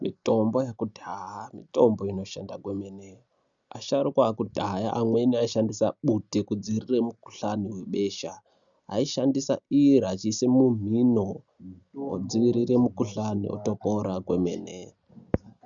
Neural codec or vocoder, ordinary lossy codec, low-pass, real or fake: none; MP3, 96 kbps; 7.2 kHz; real